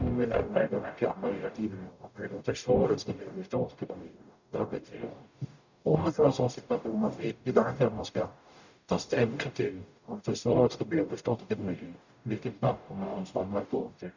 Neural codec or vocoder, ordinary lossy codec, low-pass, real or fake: codec, 44.1 kHz, 0.9 kbps, DAC; none; 7.2 kHz; fake